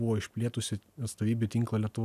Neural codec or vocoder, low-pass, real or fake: none; 14.4 kHz; real